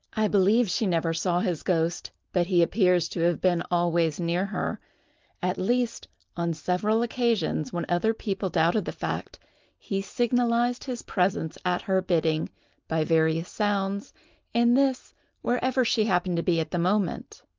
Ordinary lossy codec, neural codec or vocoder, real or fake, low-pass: Opus, 32 kbps; none; real; 7.2 kHz